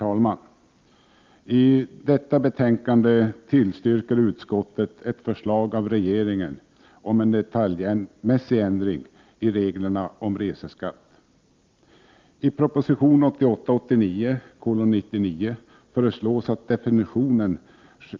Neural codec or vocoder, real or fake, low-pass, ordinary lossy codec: none; real; 7.2 kHz; Opus, 24 kbps